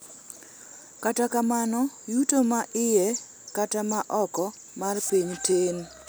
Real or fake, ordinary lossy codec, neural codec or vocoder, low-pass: real; none; none; none